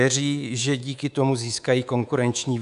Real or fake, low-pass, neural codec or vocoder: fake; 10.8 kHz; codec, 24 kHz, 3.1 kbps, DualCodec